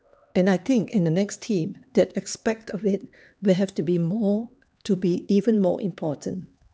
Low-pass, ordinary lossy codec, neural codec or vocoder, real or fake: none; none; codec, 16 kHz, 2 kbps, X-Codec, HuBERT features, trained on LibriSpeech; fake